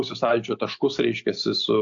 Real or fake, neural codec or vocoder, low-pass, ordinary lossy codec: real; none; 7.2 kHz; AAC, 64 kbps